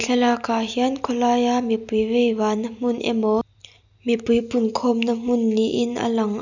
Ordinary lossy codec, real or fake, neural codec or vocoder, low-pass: none; real; none; 7.2 kHz